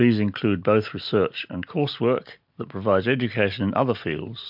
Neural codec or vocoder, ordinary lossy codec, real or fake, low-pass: none; MP3, 48 kbps; real; 5.4 kHz